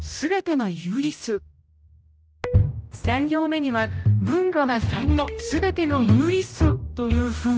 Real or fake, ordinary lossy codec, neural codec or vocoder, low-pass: fake; none; codec, 16 kHz, 0.5 kbps, X-Codec, HuBERT features, trained on general audio; none